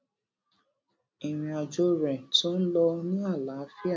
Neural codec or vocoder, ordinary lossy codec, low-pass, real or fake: none; none; none; real